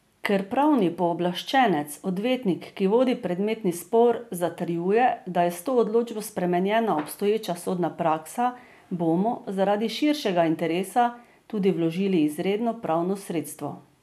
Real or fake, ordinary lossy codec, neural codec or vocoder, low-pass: real; none; none; 14.4 kHz